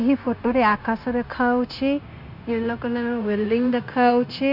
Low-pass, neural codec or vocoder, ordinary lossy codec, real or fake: 5.4 kHz; codec, 16 kHz, 0.9 kbps, LongCat-Audio-Codec; none; fake